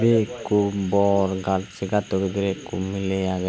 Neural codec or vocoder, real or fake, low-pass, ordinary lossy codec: none; real; none; none